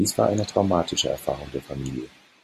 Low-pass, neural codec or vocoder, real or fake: 14.4 kHz; none; real